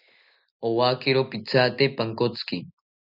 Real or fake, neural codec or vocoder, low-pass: real; none; 5.4 kHz